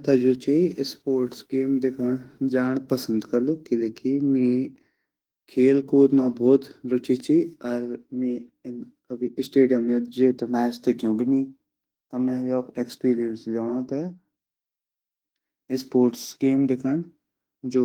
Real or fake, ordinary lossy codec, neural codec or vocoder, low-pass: fake; Opus, 24 kbps; autoencoder, 48 kHz, 32 numbers a frame, DAC-VAE, trained on Japanese speech; 19.8 kHz